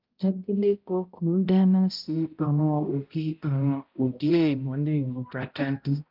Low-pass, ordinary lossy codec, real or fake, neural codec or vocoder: 5.4 kHz; Opus, 24 kbps; fake; codec, 16 kHz, 0.5 kbps, X-Codec, HuBERT features, trained on balanced general audio